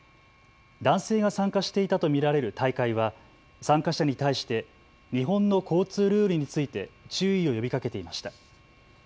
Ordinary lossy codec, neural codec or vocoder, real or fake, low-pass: none; none; real; none